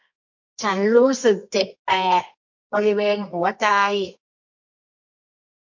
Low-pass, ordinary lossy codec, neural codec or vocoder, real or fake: 7.2 kHz; MP3, 48 kbps; codec, 24 kHz, 0.9 kbps, WavTokenizer, medium music audio release; fake